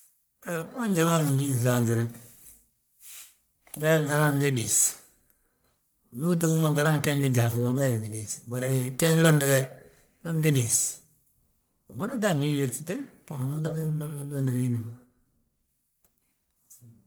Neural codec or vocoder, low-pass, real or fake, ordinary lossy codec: codec, 44.1 kHz, 1.7 kbps, Pupu-Codec; none; fake; none